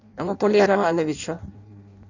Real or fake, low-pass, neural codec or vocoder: fake; 7.2 kHz; codec, 16 kHz in and 24 kHz out, 0.6 kbps, FireRedTTS-2 codec